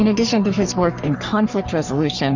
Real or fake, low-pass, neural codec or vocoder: fake; 7.2 kHz; codec, 44.1 kHz, 3.4 kbps, Pupu-Codec